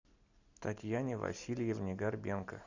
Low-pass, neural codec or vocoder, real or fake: 7.2 kHz; none; real